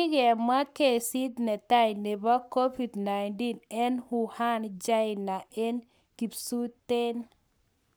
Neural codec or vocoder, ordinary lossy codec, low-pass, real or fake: codec, 44.1 kHz, 7.8 kbps, Pupu-Codec; none; none; fake